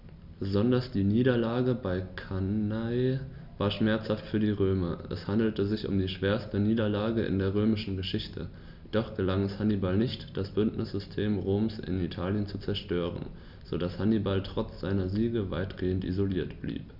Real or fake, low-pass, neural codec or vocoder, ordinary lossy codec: real; 5.4 kHz; none; none